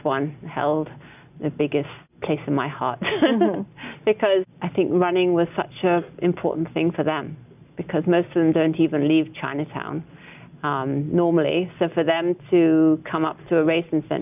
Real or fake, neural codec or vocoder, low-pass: real; none; 3.6 kHz